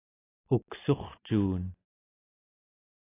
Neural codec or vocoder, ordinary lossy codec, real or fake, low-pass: none; AAC, 16 kbps; real; 3.6 kHz